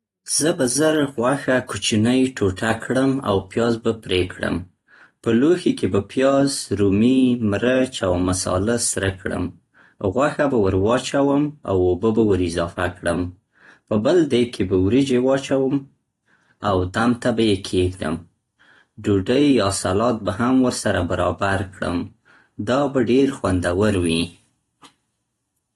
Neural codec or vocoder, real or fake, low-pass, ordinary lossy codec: none; real; 19.8 kHz; AAC, 32 kbps